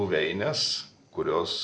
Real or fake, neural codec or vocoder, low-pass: real; none; 9.9 kHz